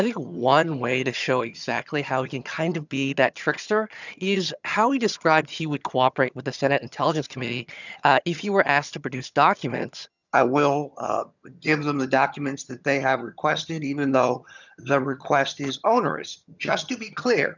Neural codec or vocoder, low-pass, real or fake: vocoder, 22.05 kHz, 80 mel bands, HiFi-GAN; 7.2 kHz; fake